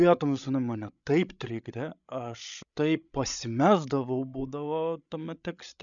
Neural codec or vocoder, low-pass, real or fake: codec, 16 kHz, 16 kbps, FreqCodec, larger model; 7.2 kHz; fake